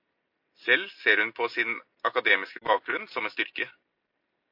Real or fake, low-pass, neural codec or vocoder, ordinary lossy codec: real; 5.4 kHz; none; MP3, 32 kbps